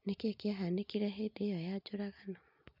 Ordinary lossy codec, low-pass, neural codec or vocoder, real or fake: MP3, 48 kbps; 5.4 kHz; none; real